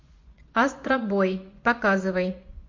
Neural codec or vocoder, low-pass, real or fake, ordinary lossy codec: vocoder, 24 kHz, 100 mel bands, Vocos; 7.2 kHz; fake; MP3, 48 kbps